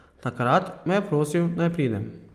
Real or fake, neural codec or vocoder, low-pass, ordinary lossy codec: real; none; 14.4 kHz; Opus, 32 kbps